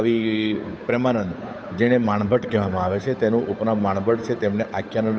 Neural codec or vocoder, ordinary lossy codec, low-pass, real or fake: codec, 16 kHz, 8 kbps, FunCodec, trained on Chinese and English, 25 frames a second; none; none; fake